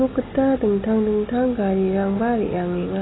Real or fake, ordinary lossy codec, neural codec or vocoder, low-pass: real; AAC, 16 kbps; none; 7.2 kHz